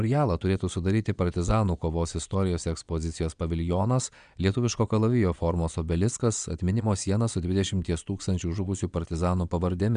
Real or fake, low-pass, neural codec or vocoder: fake; 9.9 kHz; vocoder, 22.05 kHz, 80 mel bands, Vocos